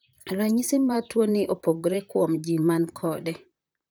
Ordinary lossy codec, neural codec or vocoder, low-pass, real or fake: none; vocoder, 44.1 kHz, 128 mel bands, Pupu-Vocoder; none; fake